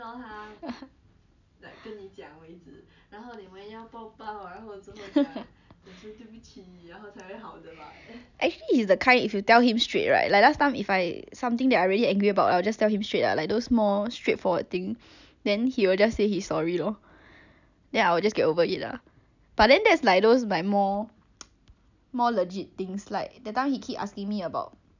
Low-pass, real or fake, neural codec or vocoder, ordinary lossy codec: 7.2 kHz; real; none; none